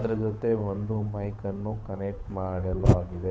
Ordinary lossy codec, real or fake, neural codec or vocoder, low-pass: none; fake; codec, 16 kHz, 8 kbps, FunCodec, trained on Chinese and English, 25 frames a second; none